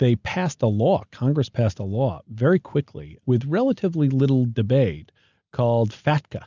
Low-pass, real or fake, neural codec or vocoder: 7.2 kHz; real; none